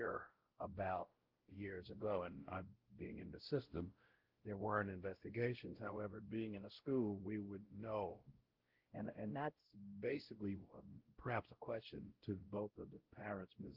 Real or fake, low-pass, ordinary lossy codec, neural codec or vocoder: fake; 5.4 kHz; Opus, 16 kbps; codec, 16 kHz, 0.5 kbps, X-Codec, WavLM features, trained on Multilingual LibriSpeech